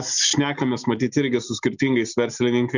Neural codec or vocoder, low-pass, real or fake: none; 7.2 kHz; real